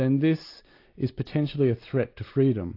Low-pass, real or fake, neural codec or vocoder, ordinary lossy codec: 5.4 kHz; real; none; AAC, 32 kbps